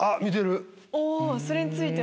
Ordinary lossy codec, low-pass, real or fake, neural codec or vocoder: none; none; real; none